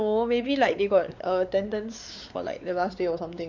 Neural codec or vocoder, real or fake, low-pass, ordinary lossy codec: codec, 16 kHz, 4 kbps, X-Codec, WavLM features, trained on Multilingual LibriSpeech; fake; 7.2 kHz; none